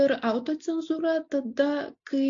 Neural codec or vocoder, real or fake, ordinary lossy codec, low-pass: none; real; AAC, 48 kbps; 7.2 kHz